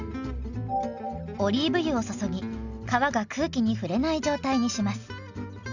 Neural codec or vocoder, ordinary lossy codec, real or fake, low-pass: none; none; real; 7.2 kHz